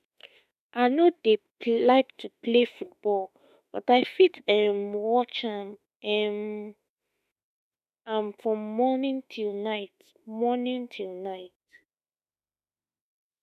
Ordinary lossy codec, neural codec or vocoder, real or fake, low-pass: none; autoencoder, 48 kHz, 32 numbers a frame, DAC-VAE, trained on Japanese speech; fake; 14.4 kHz